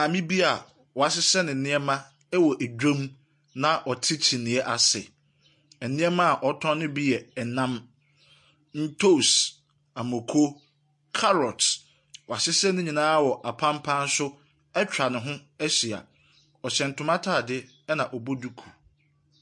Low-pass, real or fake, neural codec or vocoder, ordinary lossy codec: 10.8 kHz; real; none; MP3, 48 kbps